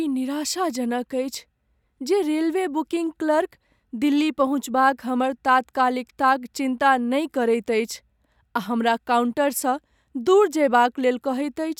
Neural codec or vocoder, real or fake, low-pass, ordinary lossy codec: none; real; 19.8 kHz; none